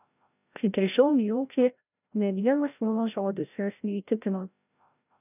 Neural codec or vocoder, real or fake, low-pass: codec, 16 kHz, 0.5 kbps, FreqCodec, larger model; fake; 3.6 kHz